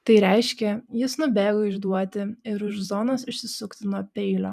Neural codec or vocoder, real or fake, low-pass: vocoder, 44.1 kHz, 128 mel bands every 512 samples, BigVGAN v2; fake; 14.4 kHz